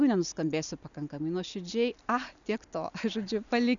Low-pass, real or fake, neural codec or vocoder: 7.2 kHz; real; none